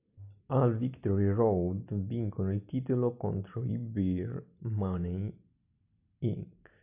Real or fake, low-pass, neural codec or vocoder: real; 3.6 kHz; none